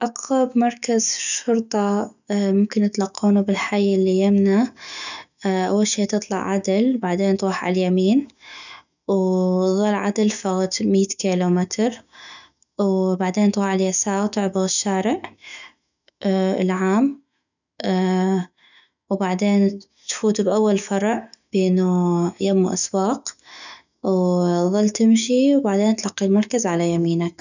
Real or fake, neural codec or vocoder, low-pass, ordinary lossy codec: fake; autoencoder, 48 kHz, 128 numbers a frame, DAC-VAE, trained on Japanese speech; 7.2 kHz; none